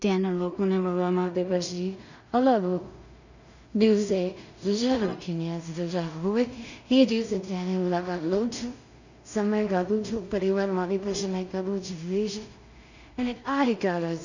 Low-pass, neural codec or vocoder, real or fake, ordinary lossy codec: 7.2 kHz; codec, 16 kHz in and 24 kHz out, 0.4 kbps, LongCat-Audio-Codec, two codebook decoder; fake; none